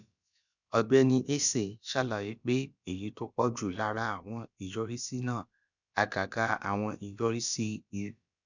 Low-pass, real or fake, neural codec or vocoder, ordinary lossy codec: 7.2 kHz; fake; codec, 16 kHz, about 1 kbps, DyCAST, with the encoder's durations; none